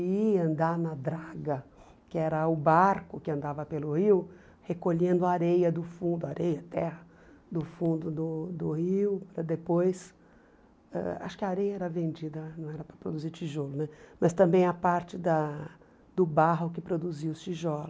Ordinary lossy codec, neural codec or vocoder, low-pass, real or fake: none; none; none; real